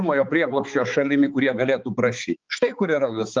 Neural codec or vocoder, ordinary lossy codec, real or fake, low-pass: codec, 16 kHz, 4 kbps, X-Codec, HuBERT features, trained on balanced general audio; Opus, 32 kbps; fake; 7.2 kHz